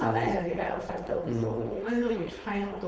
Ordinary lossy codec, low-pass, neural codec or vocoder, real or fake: none; none; codec, 16 kHz, 4.8 kbps, FACodec; fake